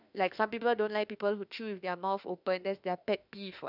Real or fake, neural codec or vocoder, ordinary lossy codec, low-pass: fake; autoencoder, 48 kHz, 32 numbers a frame, DAC-VAE, trained on Japanese speech; none; 5.4 kHz